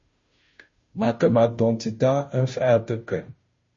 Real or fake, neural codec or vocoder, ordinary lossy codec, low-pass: fake; codec, 16 kHz, 0.5 kbps, FunCodec, trained on Chinese and English, 25 frames a second; MP3, 32 kbps; 7.2 kHz